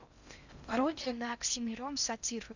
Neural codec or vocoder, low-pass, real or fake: codec, 16 kHz in and 24 kHz out, 0.6 kbps, FocalCodec, streaming, 4096 codes; 7.2 kHz; fake